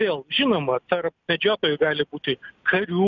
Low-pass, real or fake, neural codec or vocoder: 7.2 kHz; real; none